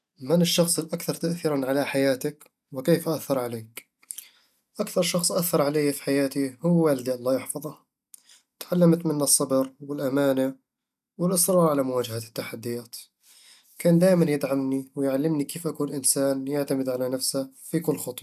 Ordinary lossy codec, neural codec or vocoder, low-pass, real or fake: none; none; 14.4 kHz; real